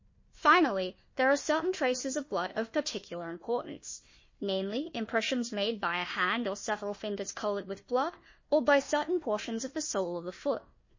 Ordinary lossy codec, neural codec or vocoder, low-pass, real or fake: MP3, 32 kbps; codec, 16 kHz, 1 kbps, FunCodec, trained on Chinese and English, 50 frames a second; 7.2 kHz; fake